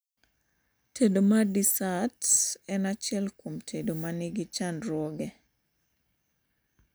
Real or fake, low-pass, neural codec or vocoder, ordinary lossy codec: real; none; none; none